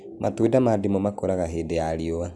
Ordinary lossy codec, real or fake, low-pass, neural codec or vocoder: none; real; none; none